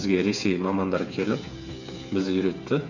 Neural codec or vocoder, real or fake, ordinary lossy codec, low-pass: codec, 16 kHz, 8 kbps, FreqCodec, smaller model; fake; none; 7.2 kHz